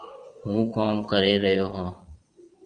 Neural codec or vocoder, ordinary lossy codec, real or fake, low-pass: vocoder, 22.05 kHz, 80 mel bands, Vocos; Opus, 32 kbps; fake; 9.9 kHz